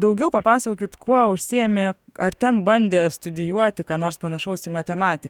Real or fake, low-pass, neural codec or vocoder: fake; 19.8 kHz; codec, 44.1 kHz, 2.6 kbps, DAC